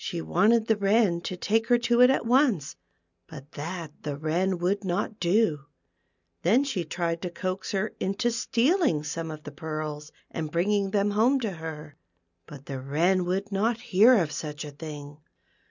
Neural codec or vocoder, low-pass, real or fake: none; 7.2 kHz; real